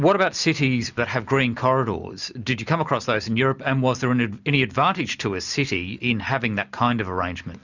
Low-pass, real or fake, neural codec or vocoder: 7.2 kHz; real; none